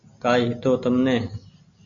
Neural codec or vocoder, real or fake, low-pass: none; real; 7.2 kHz